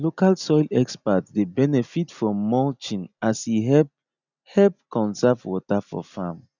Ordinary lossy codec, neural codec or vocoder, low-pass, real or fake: none; none; 7.2 kHz; real